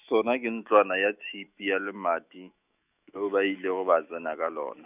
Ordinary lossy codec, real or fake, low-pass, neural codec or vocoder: none; fake; 3.6 kHz; autoencoder, 48 kHz, 128 numbers a frame, DAC-VAE, trained on Japanese speech